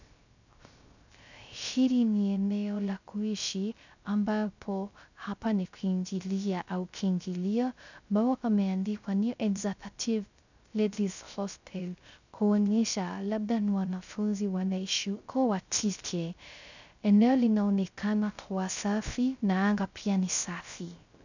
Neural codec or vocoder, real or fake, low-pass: codec, 16 kHz, 0.3 kbps, FocalCodec; fake; 7.2 kHz